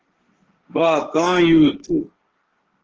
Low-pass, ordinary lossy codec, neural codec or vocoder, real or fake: 7.2 kHz; Opus, 16 kbps; codec, 16 kHz, 1.1 kbps, Voila-Tokenizer; fake